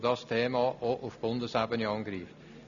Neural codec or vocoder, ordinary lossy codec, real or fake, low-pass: none; none; real; 7.2 kHz